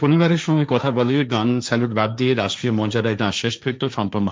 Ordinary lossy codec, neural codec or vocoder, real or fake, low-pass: none; codec, 16 kHz, 1.1 kbps, Voila-Tokenizer; fake; none